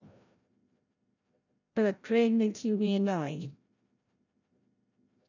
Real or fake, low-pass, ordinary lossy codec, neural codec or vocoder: fake; 7.2 kHz; none; codec, 16 kHz, 0.5 kbps, FreqCodec, larger model